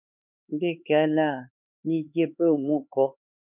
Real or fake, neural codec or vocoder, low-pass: fake; codec, 16 kHz, 4 kbps, X-Codec, HuBERT features, trained on LibriSpeech; 3.6 kHz